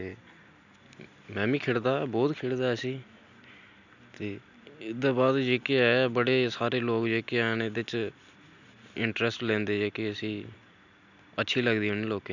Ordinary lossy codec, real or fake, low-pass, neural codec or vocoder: none; real; 7.2 kHz; none